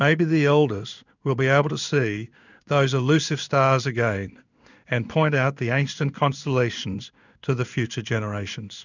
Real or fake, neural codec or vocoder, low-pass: fake; codec, 16 kHz in and 24 kHz out, 1 kbps, XY-Tokenizer; 7.2 kHz